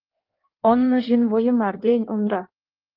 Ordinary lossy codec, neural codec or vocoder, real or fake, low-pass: Opus, 32 kbps; codec, 16 kHz in and 24 kHz out, 1.1 kbps, FireRedTTS-2 codec; fake; 5.4 kHz